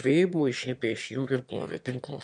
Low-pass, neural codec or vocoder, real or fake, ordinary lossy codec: 9.9 kHz; autoencoder, 22.05 kHz, a latent of 192 numbers a frame, VITS, trained on one speaker; fake; MP3, 64 kbps